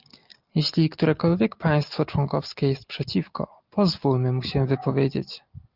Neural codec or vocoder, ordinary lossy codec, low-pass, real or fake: none; Opus, 32 kbps; 5.4 kHz; real